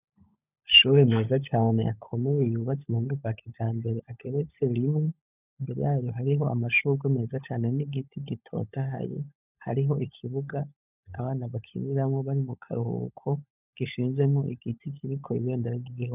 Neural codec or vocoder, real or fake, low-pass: codec, 16 kHz, 16 kbps, FunCodec, trained on LibriTTS, 50 frames a second; fake; 3.6 kHz